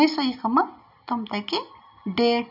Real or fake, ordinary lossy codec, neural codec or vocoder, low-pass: real; none; none; 5.4 kHz